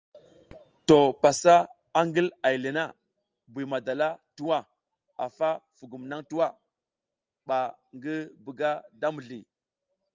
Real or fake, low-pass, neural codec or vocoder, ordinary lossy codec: real; 7.2 kHz; none; Opus, 24 kbps